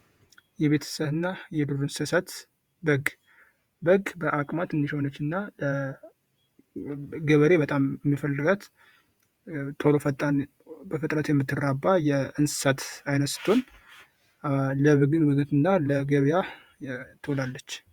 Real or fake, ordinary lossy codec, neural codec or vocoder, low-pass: fake; Opus, 64 kbps; vocoder, 48 kHz, 128 mel bands, Vocos; 19.8 kHz